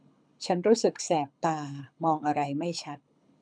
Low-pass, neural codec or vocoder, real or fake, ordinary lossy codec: 9.9 kHz; codec, 24 kHz, 6 kbps, HILCodec; fake; none